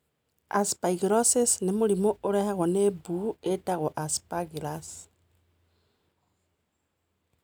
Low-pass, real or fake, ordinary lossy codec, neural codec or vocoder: none; real; none; none